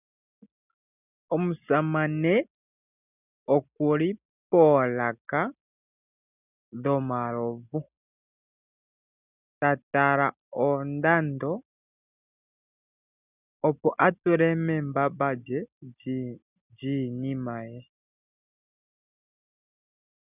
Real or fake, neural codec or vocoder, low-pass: real; none; 3.6 kHz